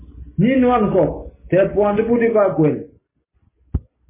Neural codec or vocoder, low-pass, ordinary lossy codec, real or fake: none; 3.6 kHz; MP3, 16 kbps; real